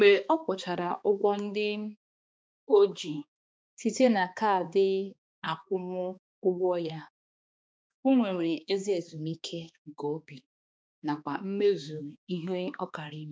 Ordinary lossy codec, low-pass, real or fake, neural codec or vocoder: none; none; fake; codec, 16 kHz, 2 kbps, X-Codec, HuBERT features, trained on balanced general audio